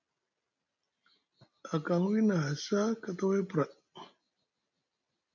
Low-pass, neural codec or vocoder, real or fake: 7.2 kHz; none; real